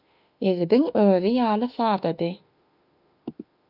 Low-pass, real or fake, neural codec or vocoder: 5.4 kHz; fake; autoencoder, 48 kHz, 32 numbers a frame, DAC-VAE, trained on Japanese speech